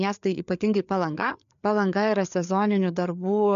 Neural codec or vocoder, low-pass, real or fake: codec, 16 kHz, 4 kbps, FreqCodec, larger model; 7.2 kHz; fake